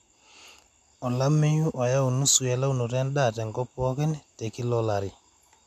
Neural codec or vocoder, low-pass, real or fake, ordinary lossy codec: none; 14.4 kHz; real; AAC, 96 kbps